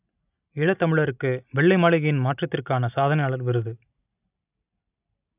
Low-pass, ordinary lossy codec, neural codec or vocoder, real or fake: 3.6 kHz; none; none; real